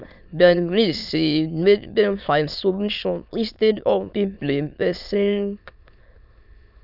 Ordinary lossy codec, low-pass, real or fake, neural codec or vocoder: none; 5.4 kHz; fake; autoencoder, 22.05 kHz, a latent of 192 numbers a frame, VITS, trained on many speakers